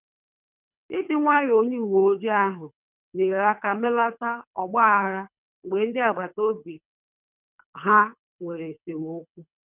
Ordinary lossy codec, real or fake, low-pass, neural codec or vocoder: none; fake; 3.6 kHz; codec, 24 kHz, 3 kbps, HILCodec